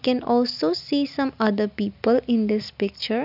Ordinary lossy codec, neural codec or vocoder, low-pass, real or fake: none; none; 5.4 kHz; real